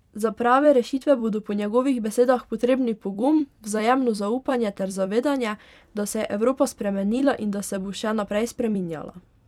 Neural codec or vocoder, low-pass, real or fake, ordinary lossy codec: vocoder, 44.1 kHz, 128 mel bands every 256 samples, BigVGAN v2; 19.8 kHz; fake; none